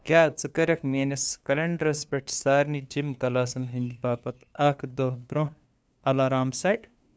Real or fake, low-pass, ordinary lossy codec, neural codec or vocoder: fake; none; none; codec, 16 kHz, 2 kbps, FunCodec, trained on LibriTTS, 25 frames a second